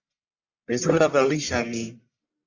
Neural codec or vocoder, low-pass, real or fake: codec, 44.1 kHz, 1.7 kbps, Pupu-Codec; 7.2 kHz; fake